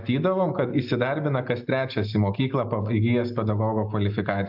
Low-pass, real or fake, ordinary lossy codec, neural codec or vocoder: 5.4 kHz; real; AAC, 48 kbps; none